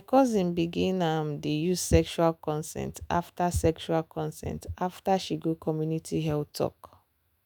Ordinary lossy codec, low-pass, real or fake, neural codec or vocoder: none; none; fake; autoencoder, 48 kHz, 128 numbers a frame, DAC-VAE, trained on Japanese speech